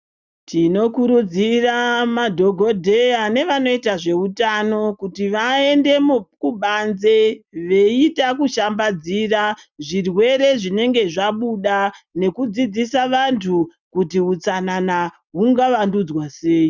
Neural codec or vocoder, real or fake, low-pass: none; real; 7.2 kHz